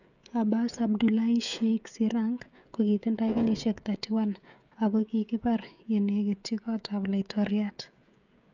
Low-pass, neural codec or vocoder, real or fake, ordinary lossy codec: 7.2 kHz; codec, 16 kHz, 16 kbps, FreqCodec, smaller model; fake; none